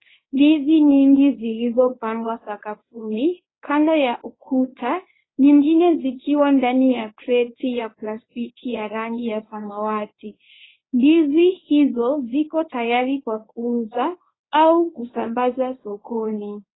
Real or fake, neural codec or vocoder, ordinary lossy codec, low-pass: fake; codec, 24 kHz, 0.9 kbps, WavTokenizer, medium speech release version 1; AAC, 16 kbps; 7.2 kHz